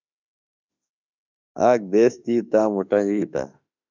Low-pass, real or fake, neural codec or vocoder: 7.2 kHz; fake; autoencoder, 48 kHz, 32 numbers a frame, DAC-VAE, trained on Japanese speech